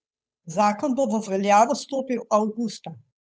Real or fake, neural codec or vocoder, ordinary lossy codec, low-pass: fake; codec, 16 kHz, 8 kbps, FunCodec, trained on Chinese and English, 25 frames a second; none; none